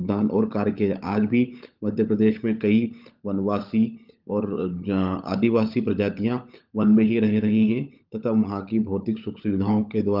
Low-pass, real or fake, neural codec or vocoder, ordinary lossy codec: 5.4 kHz; fake; codec, 16 kHz, 16 kbps, FunCodec, trained on LibriTTS, 50 frames a second; Opus, 24 kbps